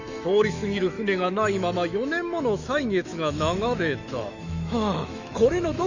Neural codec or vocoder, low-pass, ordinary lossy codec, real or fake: autoencoder, 48 kHz, 128 numbers a frame, DAC-VAE, trained on Japanese speech; 7.2 kHz; none; fake